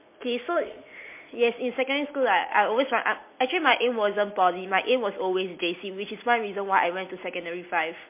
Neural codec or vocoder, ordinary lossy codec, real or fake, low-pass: none; MP3, 24 kbps; real; 3.6 kHz